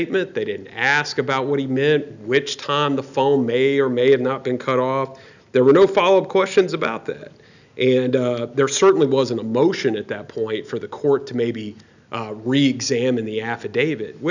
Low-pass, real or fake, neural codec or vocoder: 7.2 kHz; real; none